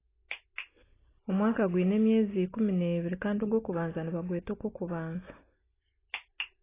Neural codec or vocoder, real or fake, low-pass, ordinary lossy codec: none; real; 3.6 kHz; AAC, 16 kbps